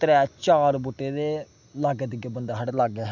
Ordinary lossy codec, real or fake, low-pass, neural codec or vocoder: none; real; 7.2 kHz; none